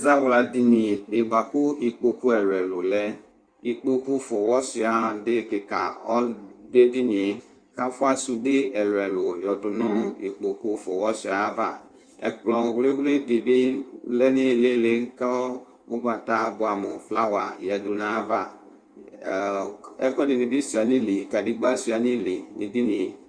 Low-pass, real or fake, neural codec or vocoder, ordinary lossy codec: 9.9 kHz; fake; codec, 16 kHz in and 24 kHz out, 1.1 kbps, FireRedTTS-2 codec; Opus, 64 kbps